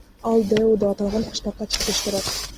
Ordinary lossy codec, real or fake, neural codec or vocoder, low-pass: Opus, 24 kbps; real; none; 14.4 kHz